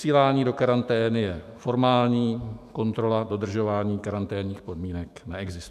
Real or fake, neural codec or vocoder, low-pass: fake; autoencoder, 48 kHz, 128 numbers a frame, DAC-VAE, trained on Japanese speech; 14.4 kHz